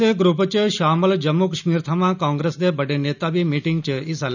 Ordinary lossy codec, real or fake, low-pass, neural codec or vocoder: none; real; 7.2 kHz; none